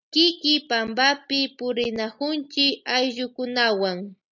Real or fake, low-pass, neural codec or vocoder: real; 7.2 kHz; none